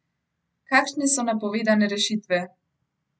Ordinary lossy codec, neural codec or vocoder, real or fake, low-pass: none; none; real; none